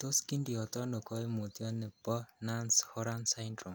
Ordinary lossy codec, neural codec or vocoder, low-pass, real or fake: none; none; none; real